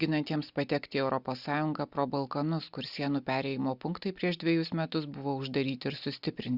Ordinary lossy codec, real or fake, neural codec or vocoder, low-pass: Opus, 64 kbps; real; none; 5.4 kHz